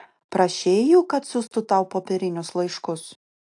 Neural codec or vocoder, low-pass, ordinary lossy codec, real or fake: none; 10.8 kHz; AAC, 64 kbps; real